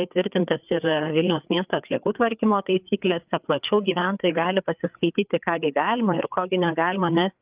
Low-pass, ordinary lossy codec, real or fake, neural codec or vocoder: 3.6 kHz; Opus, 24 kbps; fake; codec, 16 kHz, 4 kbps, FreqCodec, larger model